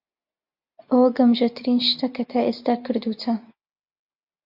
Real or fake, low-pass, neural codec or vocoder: real; 5.4 kHz; none